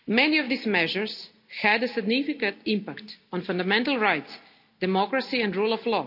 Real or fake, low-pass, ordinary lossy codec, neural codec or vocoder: real; 5.4 kHz; none; none